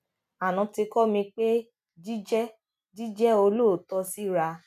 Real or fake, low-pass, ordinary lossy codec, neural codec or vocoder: real; 14.4 kHz; none; none